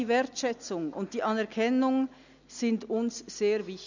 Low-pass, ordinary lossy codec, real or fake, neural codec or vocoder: 7.2 kHz; none; real; none